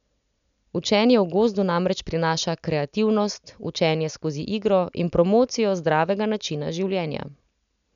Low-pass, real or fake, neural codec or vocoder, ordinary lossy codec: 7.2 kHz; real; none; none